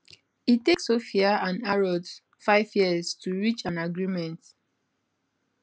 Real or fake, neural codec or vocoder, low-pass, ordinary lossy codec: real; none; none; none